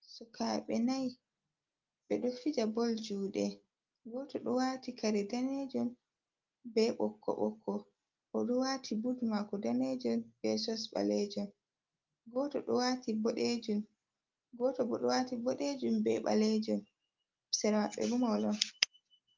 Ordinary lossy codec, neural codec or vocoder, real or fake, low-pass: Opus, 24 kbps; none; real; 7.2 kHz